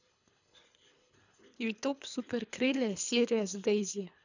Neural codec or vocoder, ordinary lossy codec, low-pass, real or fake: codec, 24 kHz, 3 kbps, HILCodec; none; 7.2 kHz; fake